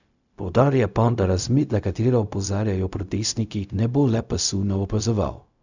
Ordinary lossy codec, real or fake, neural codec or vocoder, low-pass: none; fake; codec, 16 kHz, 0.4 kbps, LongCat-Audio-Codec; 7.2 kHz